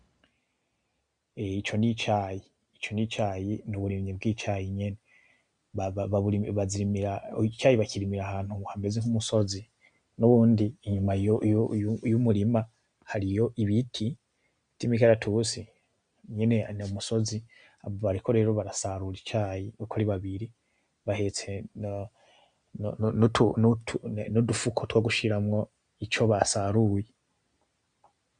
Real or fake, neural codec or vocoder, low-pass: real; none; 9.9 kHz